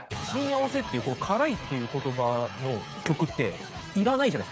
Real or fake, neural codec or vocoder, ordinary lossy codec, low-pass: fake; codec, 16 kHz, 8 kbps, FreqCodec, smaller model; none; none